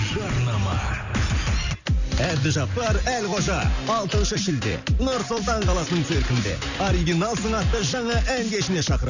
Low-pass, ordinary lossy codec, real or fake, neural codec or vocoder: 7.2 kHz; none; real; none